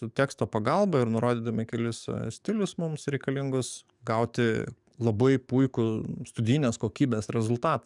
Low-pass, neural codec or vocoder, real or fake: 10.8 kHz; codec, 44.1 kHz, 7.8 kbps, DAC; fake